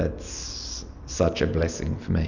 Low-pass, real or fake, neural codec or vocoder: 7.2 kHz; real; none